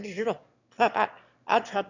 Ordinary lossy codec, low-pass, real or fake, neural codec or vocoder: none; 7.2 kHz; fake; autoencoder, 22.05 kHz, a latent of 192 numbers a frame, VITS, trained on one speaker